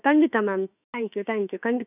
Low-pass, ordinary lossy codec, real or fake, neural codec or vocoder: 3.6 kHz; none; fake; autoencoder, 48 kHz, 32 numbers a frame, DAC-VAE, trained on Japanese speech